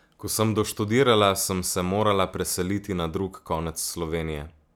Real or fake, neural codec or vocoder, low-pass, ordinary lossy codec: real; none; none; none